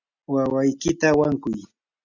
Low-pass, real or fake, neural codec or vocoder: 7.2 kHz; real; none